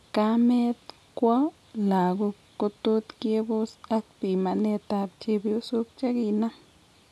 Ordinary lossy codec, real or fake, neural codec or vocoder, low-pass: none; real; none; none